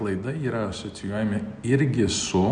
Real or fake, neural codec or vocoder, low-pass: real; none; 9.9 kHz